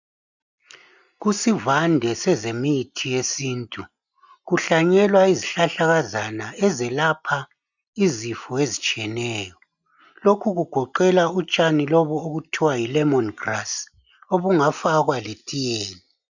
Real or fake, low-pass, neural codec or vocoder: real; 7.2 kHz; none